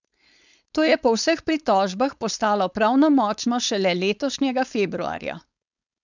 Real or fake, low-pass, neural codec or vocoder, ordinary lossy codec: fake; 7.2 kHz; codec, 16 kHz, 4.8 kbps, FACodec; none